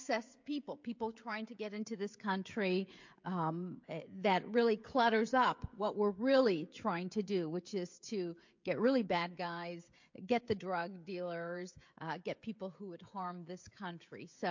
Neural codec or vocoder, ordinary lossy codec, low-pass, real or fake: codec, 16 kHz, 16 kbps, FreqCodec, smaller model; MP3, 48 kbps; 7.2 kHz; fake